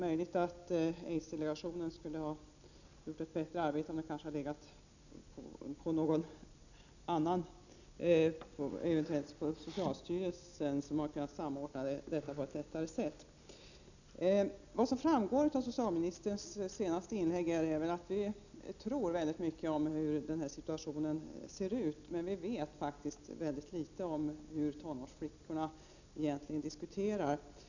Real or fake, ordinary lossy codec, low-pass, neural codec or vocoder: real; none; 7.2 kHz; none